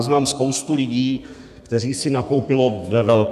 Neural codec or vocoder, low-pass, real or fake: codec, 32 kHz, 1.9 kbps, SNAC; 14.4 kHz; fake